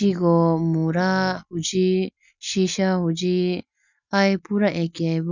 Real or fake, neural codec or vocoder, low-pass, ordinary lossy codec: real; none; 7.2 kHz; none